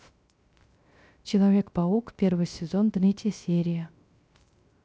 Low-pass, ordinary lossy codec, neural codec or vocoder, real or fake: none; none; codec, 16 kHz, 0.3 kbps, FocalCodec; fake